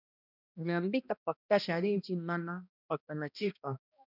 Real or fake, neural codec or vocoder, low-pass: fake; codec, 16 kHz, 1 kbps, X-Codec, HuBERT features, trained on balanced general audio; 5.4 kHz